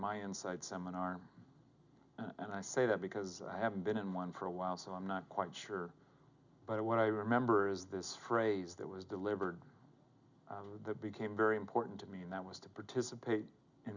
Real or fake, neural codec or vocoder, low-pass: real; none; 7.2 kHz